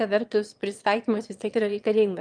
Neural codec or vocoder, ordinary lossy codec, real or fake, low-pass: autoencoder, 22.05 kHz, a latent of 192 numbers a frame, VITS, trained on one speaker; Opus, 32 kbps; fake; 9.9 kHz